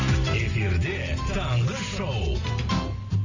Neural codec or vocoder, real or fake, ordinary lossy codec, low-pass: none; real; AAC, 48 kbps; 7.2 kHz